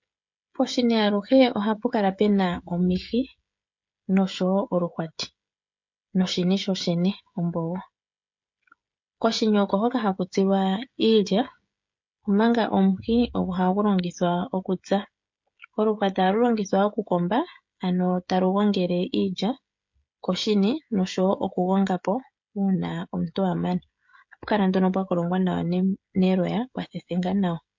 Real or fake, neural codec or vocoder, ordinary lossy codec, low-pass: fake; codec, 16 kHz, 16 kbps, FreqCodec, smaller model; MP3, 48 kbps; 7.2 kHz